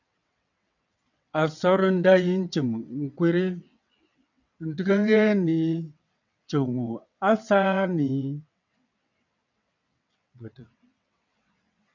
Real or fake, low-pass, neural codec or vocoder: fake; 7.2 kHz; vocoder, 22.05 kHz, 80 mel bands, WaveNeXt